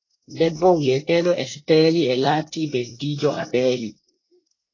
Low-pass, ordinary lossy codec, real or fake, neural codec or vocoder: 7.2 kHz; AAC, 32 kbps; fake; codec, 24 kHz, 1 kbps, SNAC